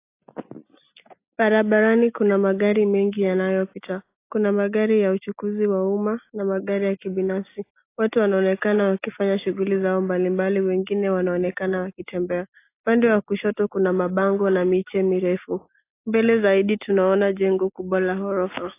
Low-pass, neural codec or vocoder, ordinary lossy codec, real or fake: 3.6 kHz; none; AAC, 24 kbps; real